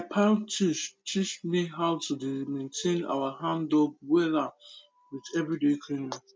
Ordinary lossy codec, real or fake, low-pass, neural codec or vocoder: none; real; none; none